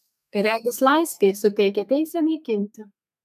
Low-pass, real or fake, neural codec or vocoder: 14.4 kHz; fake; codec, 32 kHz, 1.9 kbps, SNAC